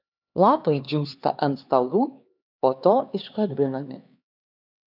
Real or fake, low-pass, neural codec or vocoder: fake; 5.4 kHz; codec, 16 kHz, 4 kbps, X-Codec, HuBERT features, trained on LibriSpeech